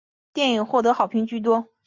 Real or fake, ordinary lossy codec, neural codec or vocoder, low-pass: real; MP3, 64 kbps; none; 7.2 kHz